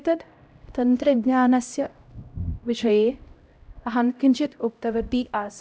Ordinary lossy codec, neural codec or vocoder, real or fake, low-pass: none; codec, 16 kHz, 0.5 kbps, X-Codec, HuBERT features, trained on LibriSpeech; fake; none